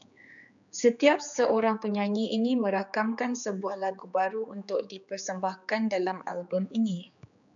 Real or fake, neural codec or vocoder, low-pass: fake; codec, 16 kHz, 4 kbps, X-Codec, HuBERT features, trained on general audio; 7.2 kHz